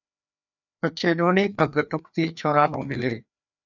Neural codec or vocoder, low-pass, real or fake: codec, 16 kHz, 2 kbps, FreqCodec, larger model; 7.2 kHz; fake